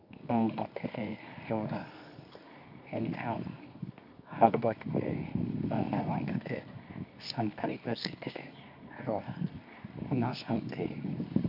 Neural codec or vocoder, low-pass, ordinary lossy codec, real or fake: codec, 24 kHz, 0.9 kbps, WavTokenizer, medium music audio release; 5.4 kHz; none; fake